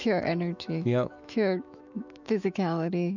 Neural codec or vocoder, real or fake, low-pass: autoencoder, 48 kHz, 128 numbers a frame, DAC-VAE, trained on Japanese speech; fake; 7.2 kHz